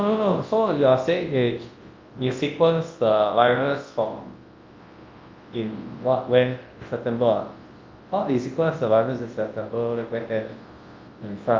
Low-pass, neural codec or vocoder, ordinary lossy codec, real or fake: 7.2 kHz; codec, 24 kHz, 0.9 kbps, WavTokenizer, large speech release; Opus, 32 kbps; fake